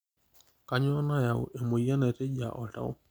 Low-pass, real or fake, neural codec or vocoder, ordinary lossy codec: none; real; none; none